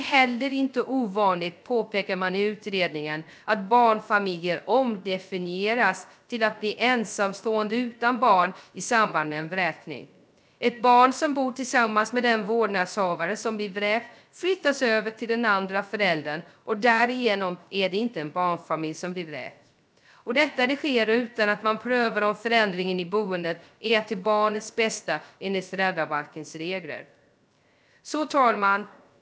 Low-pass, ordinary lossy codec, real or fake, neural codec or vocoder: none; none; fake; codec, 16 kHz, 0.3 kbps, FocalCodec